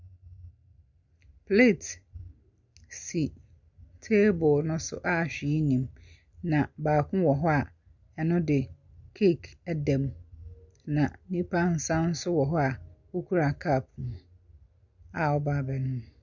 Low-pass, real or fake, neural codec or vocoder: 7.2 kHz; real; none